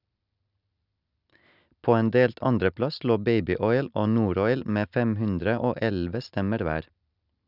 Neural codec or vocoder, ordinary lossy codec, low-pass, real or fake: none; none; 5.4 kHz; real